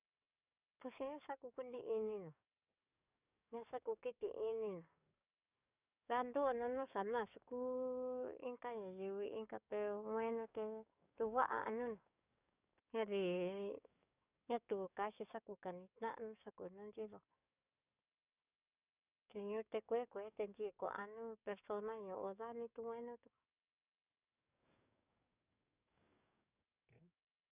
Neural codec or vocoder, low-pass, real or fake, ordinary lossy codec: codec, 44.1 kHz, 7.8 kbps, DAC; 3.6 kHz; fake; none